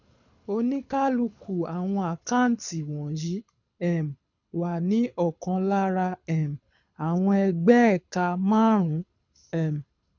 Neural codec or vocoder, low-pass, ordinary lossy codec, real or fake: codec, 24 kHz, 6 kbps, HILCodec; 7.2 kHz; none; fake